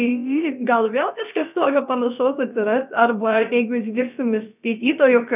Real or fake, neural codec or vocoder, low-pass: fake; codec, 16 kHz, about 1 kbps, DyCAST, with the encoder's durations; 3.6 kHz